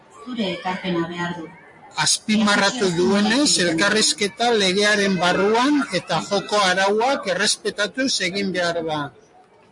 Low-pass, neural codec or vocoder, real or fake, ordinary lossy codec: 10.8 kHz; none; real; MP3, 48 kbps